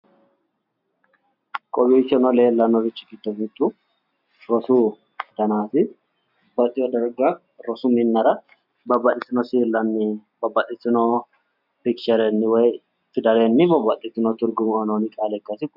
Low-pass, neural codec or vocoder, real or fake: 5.4 kHz; none; real